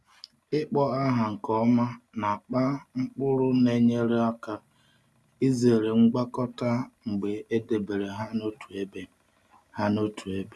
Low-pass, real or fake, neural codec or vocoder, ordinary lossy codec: none; real; none; none